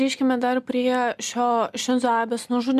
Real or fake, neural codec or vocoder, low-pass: real; none; 14.4 kHz